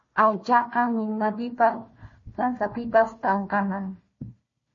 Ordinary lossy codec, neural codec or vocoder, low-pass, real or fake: MP3, 32 kbps; codec, 16 kHz, 1 kbps, FunCodec, trained on Chinese and English, 50 frames a second; 7.2 kHz; fake